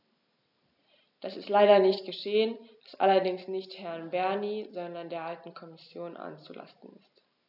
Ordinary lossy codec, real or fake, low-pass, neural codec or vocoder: none; real; 5.4 kHz; none